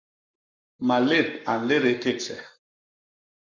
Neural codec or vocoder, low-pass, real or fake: codec, 44.1 kHz, 7.8 kbps, Pupu-Codec; 7.2 kHz; fake